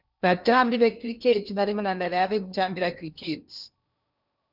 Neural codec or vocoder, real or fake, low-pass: codec, 16 kHz in and 24 kHz out, 0.8 kbps, FocalCodec, streaming, 65536 codes; fake; 5.4 kHz